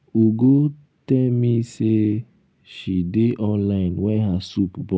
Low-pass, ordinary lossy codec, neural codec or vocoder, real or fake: none; none; none; real